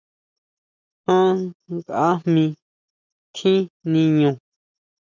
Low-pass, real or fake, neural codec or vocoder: 7.2 kHz; real; none